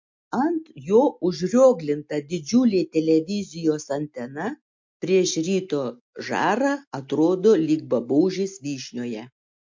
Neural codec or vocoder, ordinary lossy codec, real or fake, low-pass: none; MP3, 48 kbps; real; 7.2 kHz